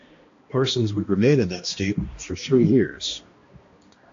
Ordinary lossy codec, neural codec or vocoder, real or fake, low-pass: AAC, 48 kbps; codec, 16 kHz, 1 kbps, X-Codec, HuBERT features, trained on balanced general audio; fake; 7.2 kHz